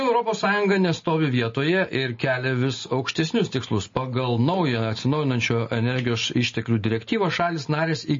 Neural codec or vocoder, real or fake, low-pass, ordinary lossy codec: none; real; 7.2 kHz; MP3, 32 kbps